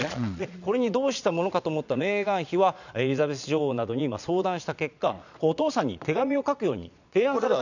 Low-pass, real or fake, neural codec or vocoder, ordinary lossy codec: 7.2 kHz; fake; vocoder, 22.05 kHz, 80 mel bands, Vocos; none